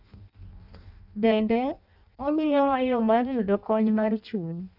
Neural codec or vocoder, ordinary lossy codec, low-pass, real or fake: codec, 16 kHz in and 24 kHz out, 0.6 kbps, FireRedTTS-2 codec; none; 5.4 kHz; fake